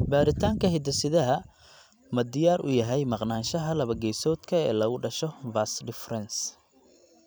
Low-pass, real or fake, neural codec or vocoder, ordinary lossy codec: none; fake; vocoder, 44.1 kHz, 128 mel bands every 256 samples, BigVGAN v2; none